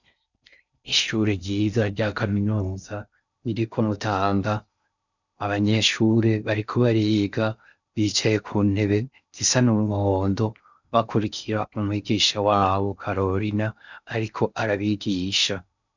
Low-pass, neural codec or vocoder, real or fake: 7.2 kHz; codec, 16 kHz in and 24 kHz out, 0.6 kbps, FocalCodec, streaming, 4096 codes; fake